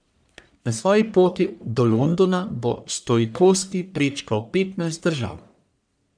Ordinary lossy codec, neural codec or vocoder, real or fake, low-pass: none; codec, 44.1 kHz, 1.7 kbps, Pupu-Codec; fake; 9.9 kHz